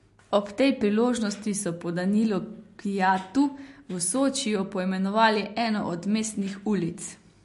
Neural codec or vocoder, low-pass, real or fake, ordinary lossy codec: none; 14.4 kHz; real; MP3, 48 kbps